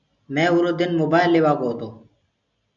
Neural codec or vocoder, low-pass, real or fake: none; 7.2 kHz; real